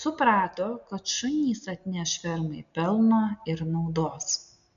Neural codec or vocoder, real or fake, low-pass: none; real; 7.2 kHz